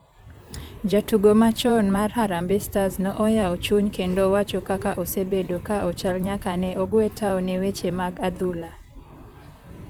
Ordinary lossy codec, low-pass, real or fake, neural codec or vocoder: none; none; fake; vocoder, 44.1 kHz, 128 mel bands, Pupu-Vocoder